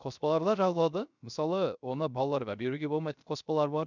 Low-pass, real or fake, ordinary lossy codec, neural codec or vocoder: 7.2 kHz; fake; none; codec, 16 kHz, 0.3 kbps, FocalCodec